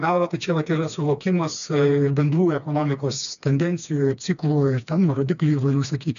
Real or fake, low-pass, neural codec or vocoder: fake; 7.2 kHz; codec, 16 kHz, 2 kbps, FreqCodec, smaller model